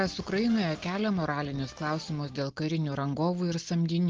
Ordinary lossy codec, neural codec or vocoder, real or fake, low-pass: Opus, 16 kbps; none; real; 7.2 kHz